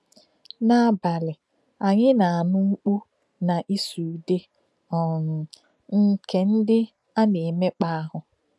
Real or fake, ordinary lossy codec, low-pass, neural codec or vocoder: real; none; none; none